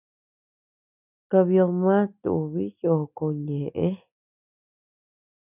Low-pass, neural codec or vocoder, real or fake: 3.6 kHz; none; real